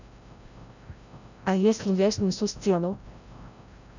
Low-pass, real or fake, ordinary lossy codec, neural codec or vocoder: 7.2 kHz; fake; none; codec, 16 kHz, 0.5 kbps, FreqCodec, larger model